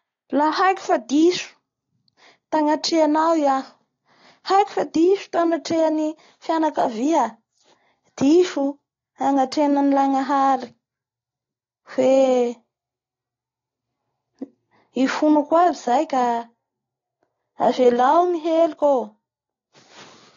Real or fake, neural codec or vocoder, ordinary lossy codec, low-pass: real; none; AAC, 32 kbps; 7.2 kHz